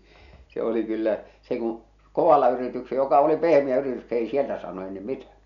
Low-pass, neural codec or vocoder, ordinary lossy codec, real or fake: 7.2 kHz; none; none; real